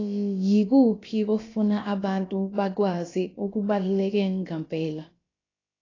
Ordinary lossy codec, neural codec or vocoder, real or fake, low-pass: AAC, 32 kbps; codec, 16 kHz, about 1 kbps, DyCAST, with the encoder's durations; fake; 7.2 kHz